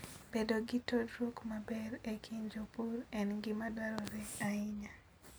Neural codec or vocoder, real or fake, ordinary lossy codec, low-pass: none; real; none; none